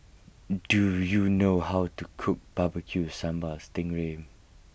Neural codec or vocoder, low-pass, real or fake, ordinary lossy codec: none; none; real; none